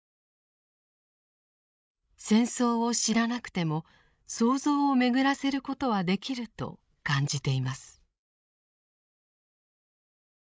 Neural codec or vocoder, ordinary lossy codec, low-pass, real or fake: codec, 16 kHz, 16 kbps, FreqCodec, larger model; none; none; fake